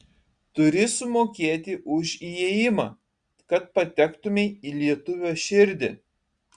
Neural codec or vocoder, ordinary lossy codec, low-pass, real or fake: none; Opus, 64 kbps; 9.9 kHz; real